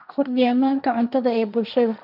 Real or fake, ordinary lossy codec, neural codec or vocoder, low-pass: fake; none; codec, 16 kHz, 1.1 kbps, Voila-Tokenizer; 5.4 kHz